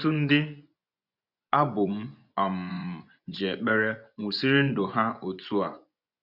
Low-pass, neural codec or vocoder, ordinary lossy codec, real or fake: 5.4 kHz; vocoder, 24 kHz, 100 mel bands, Vocos; none; fake